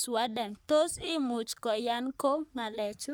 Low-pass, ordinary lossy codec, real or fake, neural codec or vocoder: none; none; fake; codec, 44.1 kHz, 3.4 kbps, Pupu-Codec